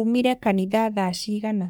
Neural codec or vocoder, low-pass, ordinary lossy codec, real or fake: codec, 44.1 kHz, 3.4 kbps, Pupu-Codec; none; none; fake